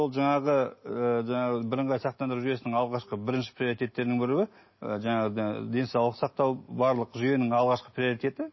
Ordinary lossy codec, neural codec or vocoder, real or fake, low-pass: MP3, 24 kbps; none; real; 7.2 kHz